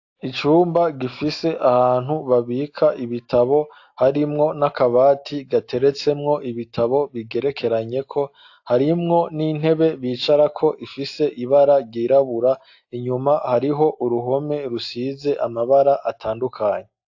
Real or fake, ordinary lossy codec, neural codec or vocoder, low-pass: real; AAC, 48 kbps; none; 7.2 kHz